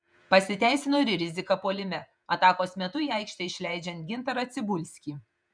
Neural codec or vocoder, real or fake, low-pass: vocoder, 48 kHz, 128 mel bands, Vocos; fake; 9.9 kHz